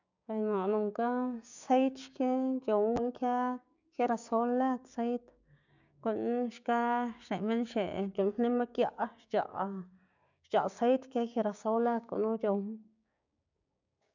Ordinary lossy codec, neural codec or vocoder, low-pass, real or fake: none; codec, 16 kHz, 6 kbps, DAC; 7.2 kHz; fake